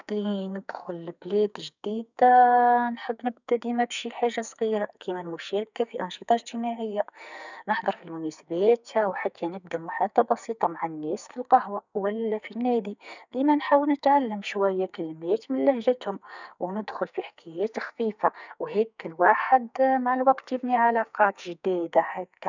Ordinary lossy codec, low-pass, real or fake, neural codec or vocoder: none; 7.2 kHz; fake; codec, 44.1 kHz, 2.6 kbps, SNAC